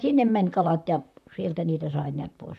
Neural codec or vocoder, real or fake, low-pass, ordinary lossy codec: vocoder, 44.1 kHz, 128 mel bands, Pupu-Vocoder; fake; 14.4 kHz; none